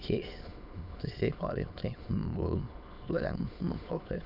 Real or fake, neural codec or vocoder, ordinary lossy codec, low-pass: fake; autoencoder, 22.05 kHz, a latent of 192 numbers a frame, VITS, trained on many speakers; none; 5.4 kHz